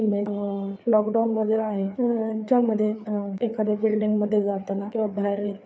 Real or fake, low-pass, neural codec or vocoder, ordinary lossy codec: fake; none; codec, 16 kHz, 4 kbps, FreqCodec, larger model; none